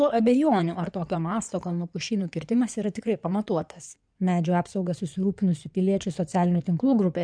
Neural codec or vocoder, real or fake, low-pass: codec, 16 kHz in and 24 kHz out, 2.2 kbps, FireRedTTS-2 codec; fake; 9.9 kHz